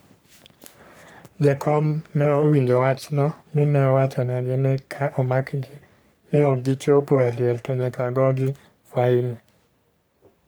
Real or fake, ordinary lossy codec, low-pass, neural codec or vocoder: fake; none; none; codec, 44.1 kHz, 3.4 kbps, Pupu-Codec